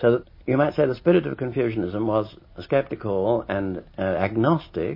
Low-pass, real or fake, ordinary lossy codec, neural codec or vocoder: 5.4 kHz; real; MP3, 24 kbps; none